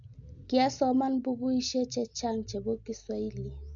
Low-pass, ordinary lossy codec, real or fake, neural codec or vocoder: 7.2 kHz; none; real; none